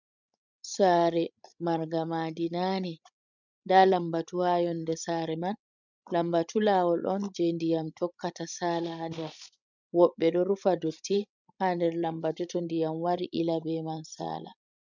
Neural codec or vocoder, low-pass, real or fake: codec, 16 kHz, 16 kbps, FreqCodec, larger model; 7.2 kHz; fake